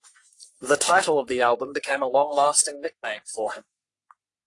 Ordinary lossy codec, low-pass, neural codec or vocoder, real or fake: AAC, 48 kbps; 10.8 kHz; codec, 44.1 kHz, 3.4 kbps, Pupu-Codec; fake